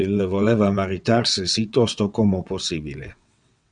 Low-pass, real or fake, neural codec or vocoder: 9.9 kHz; fake; vocoder, 22.05 kHz, 80 mel bands, WaveNeXt